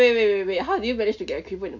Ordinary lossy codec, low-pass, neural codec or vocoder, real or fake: none; 7.2 kHz; none; real